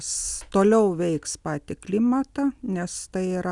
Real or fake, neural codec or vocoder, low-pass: real; none; 10.8 kHz